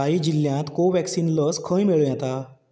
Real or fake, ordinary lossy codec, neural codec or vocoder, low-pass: real; none; none; none